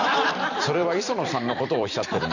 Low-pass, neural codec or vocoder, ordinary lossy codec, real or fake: 7.2 kHz; vocoder, 44.1 kHz, 128 mel bands every 256 samples, BigVGAN v2; none; fake